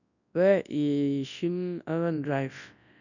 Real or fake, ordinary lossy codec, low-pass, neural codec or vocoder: fake; none; 7.2 kHz; codec, 24 kHz, 0.9 kbps, WavTokenizer, large speech release